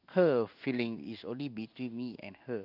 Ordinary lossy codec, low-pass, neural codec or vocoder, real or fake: none; 5.4 kHz; codec, 16 kHz in and 24 kHz out, 1 kbps, XY-Tokenizer; fake